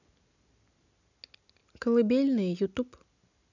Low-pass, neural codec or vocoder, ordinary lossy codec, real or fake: 7.2 kHz; none; none; real